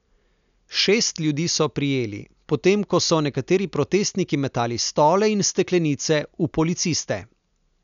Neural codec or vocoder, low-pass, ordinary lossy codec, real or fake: none; 7.2 kHz; none; real